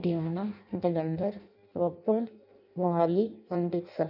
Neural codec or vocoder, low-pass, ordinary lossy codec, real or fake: codec, 16 kHz in and 24 kHz out, 0.6 kbps, FireRedTTS-2 codec; 5.4 kHz; none; fake